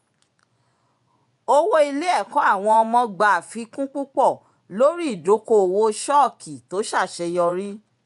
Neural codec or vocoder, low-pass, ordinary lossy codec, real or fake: vocoder, 24 kHz, 100 mel bands, Vocos; 10.8 kHz; none; fake